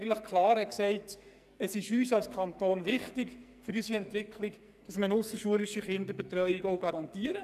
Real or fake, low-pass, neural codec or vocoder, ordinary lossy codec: fake; 14.4 kHz; codec, 44.1 kHz, 2.6 kbps, SNAC; none